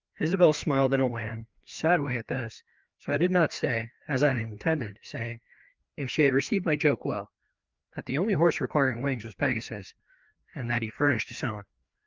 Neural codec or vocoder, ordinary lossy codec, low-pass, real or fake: codec, 16 kHz, 2 kbps, FreqCodec, larger model; Opus, 24 kbps; 7.2 kHz; fake